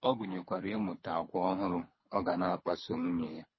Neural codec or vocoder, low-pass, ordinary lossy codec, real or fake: codec, 24 kHz, 3 kbps, HILCodec; 7.2 kHz; MP3, 24 kbps; fake